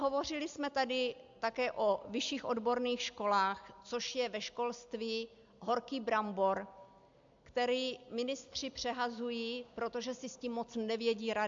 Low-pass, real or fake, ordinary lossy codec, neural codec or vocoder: 7.2 kHz; real; AAC, 64 kbps; none